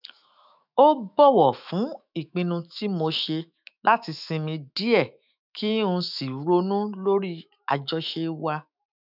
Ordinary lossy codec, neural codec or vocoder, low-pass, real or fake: none; autoencoder, 48 kHz, 128 numbers a frame, DAC-VAE, trained on Japanese speech; 5.4 kHz; fake